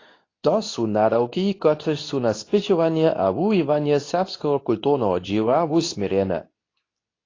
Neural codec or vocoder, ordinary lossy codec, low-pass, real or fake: codec, 24 kHz, 0.9 kbps, WavTokenizer, medium speech release version 2; AAC, 32 kbps; 7.2 kHz; fake